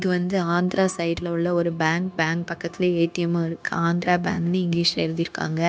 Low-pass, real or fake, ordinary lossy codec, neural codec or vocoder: none; fake; none; codec, 16 kHz, 0.9 kbps, LongCat-Audio-Codec